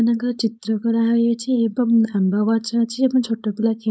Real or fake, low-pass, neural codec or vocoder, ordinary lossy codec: fake; none; codec, 16 kHz, 4.8 kbps, FACodec; none